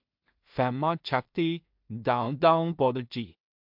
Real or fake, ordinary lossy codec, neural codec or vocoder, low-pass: fake; MP3, 48 kbps; codec, 16 kHz in and 24 kHz out, 0.4 kbps, LongCat-Audio-Codec, two codebook decoder; 5.4 kHz